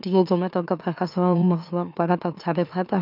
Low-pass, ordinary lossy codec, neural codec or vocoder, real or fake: 5.4 kHz; none; autoencoder, 44.1 kHz, a latent of 192 numbers a frame, MeloTTS; fake